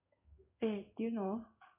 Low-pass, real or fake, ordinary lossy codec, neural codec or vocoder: 3.6 kHz; fake; none; codec, 16 kHz in and 24 kHz out, 1 kbps, XY-Tokenizer